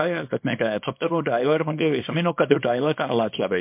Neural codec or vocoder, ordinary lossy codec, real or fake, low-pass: codec, 24 kHz, 0.9 kbps, WavTokenizer, small release; MP3, 32 kbps; fake; 3.6 kHz